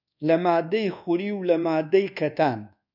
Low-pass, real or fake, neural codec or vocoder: 5.4 kHz; fake; codec, 24 kHz, 1.2 kbps, DualCodec